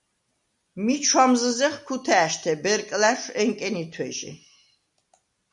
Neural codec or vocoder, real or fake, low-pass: none; real; 10.8 kHz